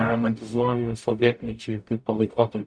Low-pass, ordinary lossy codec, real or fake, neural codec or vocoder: 9.9 kHz; MP3, 96 kbps; fake; codec, 44.1 kHz, 0.9 kbps, DAC